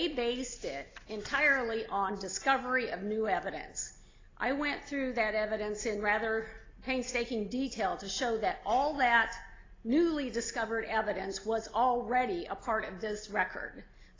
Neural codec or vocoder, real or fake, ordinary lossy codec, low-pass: none; real; AAC, 32 kbps; 7.2 kHz